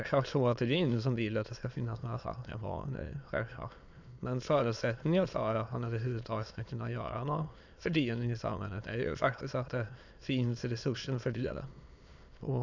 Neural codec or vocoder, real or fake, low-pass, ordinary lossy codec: autoencoder, 22.05 kHz, a latent of 192 numbers a frame, VITS, trained on many speakers; fake; 7.2 kHz; none